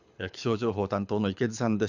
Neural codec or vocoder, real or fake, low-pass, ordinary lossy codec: codec, 24 kHz, 6 kbps, HILCodec; fake; 7.2 kHz; none